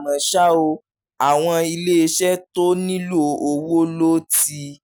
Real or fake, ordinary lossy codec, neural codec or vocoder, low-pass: real; none; none; none